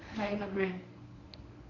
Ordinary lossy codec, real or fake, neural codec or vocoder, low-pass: none; fake; codec, 24 kHz, 0.9 kbps, WavTokenizer, medium speech release version 1; 7.2 kHz